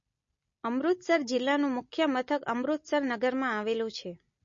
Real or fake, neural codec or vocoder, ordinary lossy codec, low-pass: real; none; MP3, 32 kbps; 7.2 kHz